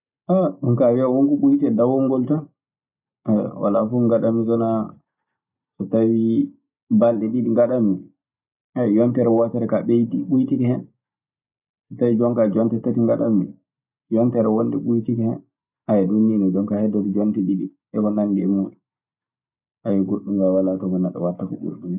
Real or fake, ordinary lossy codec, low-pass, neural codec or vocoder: real; none; 3.6 kHz; none